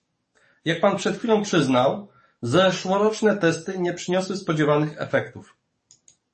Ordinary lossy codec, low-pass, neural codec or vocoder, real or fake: MP3, 32 kbps; 10.8 kHz; codec, 44.1 kHz, 7.8 kbps, DAC; fake